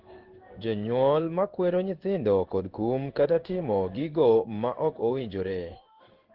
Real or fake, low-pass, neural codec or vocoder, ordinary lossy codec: fake; 5.4 kHz; codec, 16 kHz in and 24 kHz out, 1 kbps, XY-Tokenizer; Opus, 16 kbps